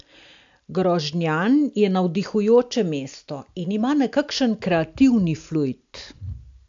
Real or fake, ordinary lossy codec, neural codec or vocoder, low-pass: real; AAC, 64 kbps; none; 7.2 kHz